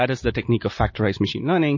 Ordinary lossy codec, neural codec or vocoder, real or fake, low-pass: MP3, 32 kbps; none; real; 7.2 kHz